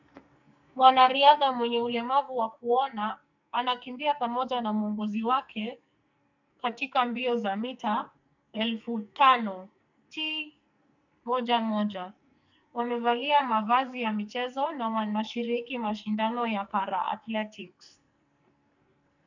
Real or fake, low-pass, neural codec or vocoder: fake; 7.2 kHz; codec, 44.1 kHz, 2.6 kbps, SNAC